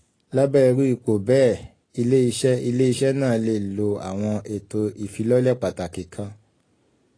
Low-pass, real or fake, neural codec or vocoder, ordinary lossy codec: 9.9 kHz; real; none; AAC, 32 kbps